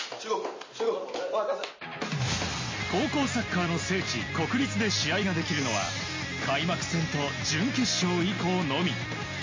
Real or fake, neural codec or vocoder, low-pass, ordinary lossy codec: real; none; 7.2 kHz; MP3, 32 kbps